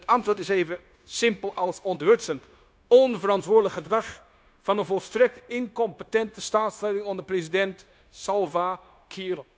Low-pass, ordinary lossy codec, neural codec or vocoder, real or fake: none; none; codec, 16 kHz, 0.9 kbps, LongCat-Audio-Codec; fake